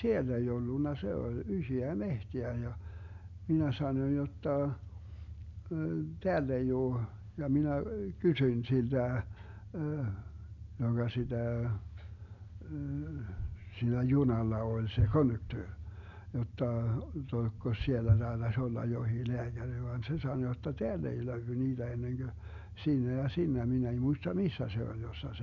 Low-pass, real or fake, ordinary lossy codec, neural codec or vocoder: 7.2 kHz; real; MP3, 64 kbps; none